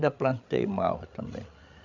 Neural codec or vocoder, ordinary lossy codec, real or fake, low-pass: codec, 16 kHz, 16 kbps, FreqCodec, larger model; none; fake; 7.2 kHz